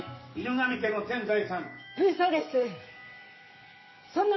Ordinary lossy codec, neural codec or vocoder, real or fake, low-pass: MP3, 24 kbps; codec, 44.1 kHz, 7.8 kbps, DAC; fake; 7.2 kHz